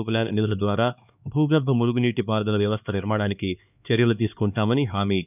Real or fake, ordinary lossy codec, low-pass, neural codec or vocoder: fake; none; 3.6 kHz; codec, 16 kHz, 2 kbps, X-Codec, HuBERT features, trained on LibriSpeech